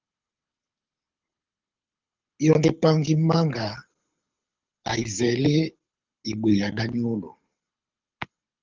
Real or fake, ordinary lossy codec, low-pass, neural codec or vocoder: fake; Opus, 24 kbps; 7.2 kHz; codec, 24 kHz, 6 kbps, HILCodec